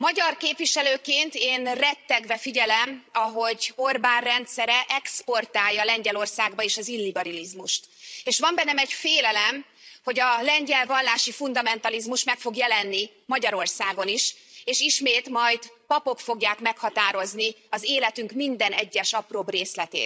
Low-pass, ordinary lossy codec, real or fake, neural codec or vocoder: none; none; fake; codec, 16 kHz, 16 kbps, FreqCodec, larger model